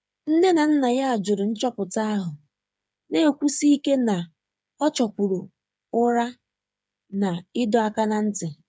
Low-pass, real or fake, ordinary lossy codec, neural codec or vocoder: none; fake; none; codec, 16 kHz, 8 kbps, FreqCodec, smaller model